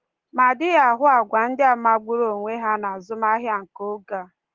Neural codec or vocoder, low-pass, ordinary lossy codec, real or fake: none; 7.2 kHz; Opus, 16 kbps; real